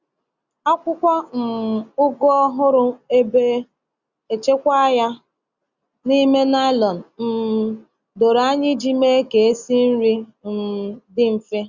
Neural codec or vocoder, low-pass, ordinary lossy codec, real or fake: none; 7.2 kHz; Opus, 64 kbps; real